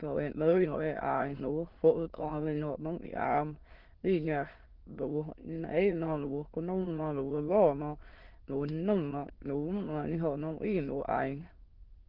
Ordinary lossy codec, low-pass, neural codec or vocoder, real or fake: Opus, 16 kbps; 5.4 kHz; autoencoder, 22.05 kHz, a latent of 192 numbers a frame, VITS, trained on many speakers; fake